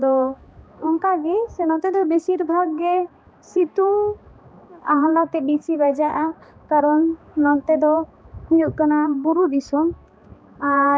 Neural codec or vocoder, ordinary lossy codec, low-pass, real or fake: codec, 16 kHz, 2 kbps, X-Codec, HuBERT features, trained on balanced general audio; none; none; fake